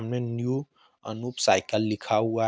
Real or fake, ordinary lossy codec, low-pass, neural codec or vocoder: real; none; none; none